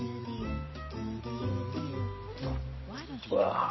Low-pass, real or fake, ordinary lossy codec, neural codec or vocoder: 7.2 kHz; real; MP3, 24 kbps; none